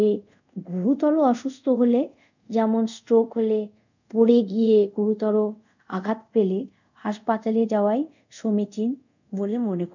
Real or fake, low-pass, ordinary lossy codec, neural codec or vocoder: fake; 7.2 kHz; none; codec, 24 kHz, 0.5 kbps, DualCodec